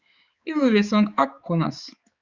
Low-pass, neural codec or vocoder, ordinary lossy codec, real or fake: 7.2 kHz; codec, 16 kHz, 4 kbps, X-Codec, HuBERT features, trained on balanced general audio; Opus, 64 kbps; fake